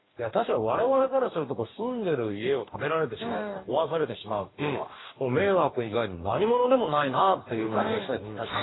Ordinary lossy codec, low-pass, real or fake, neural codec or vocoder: AAC, 16 kbps; 7.2 kHz; fake; codec, 44.1 kHz, 2.6 kbps, DAC